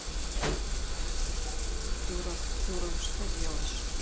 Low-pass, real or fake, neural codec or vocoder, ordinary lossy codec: none; real; none; none